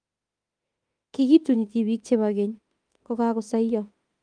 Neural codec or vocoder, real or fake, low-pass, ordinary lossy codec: autoencoder, 48 kHz, 32 numbers a frame, DAC-VAE, trained on Japanese speech; fake; 9.9 kHz; Opus, 32 kbps